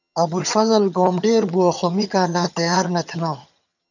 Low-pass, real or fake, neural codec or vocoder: 7.2 kHz; fake; vocoder, 22.05 kHz, 80 mel bands, HiFi-GAN